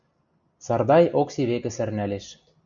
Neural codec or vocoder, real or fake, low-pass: none; real; 7.2 kHz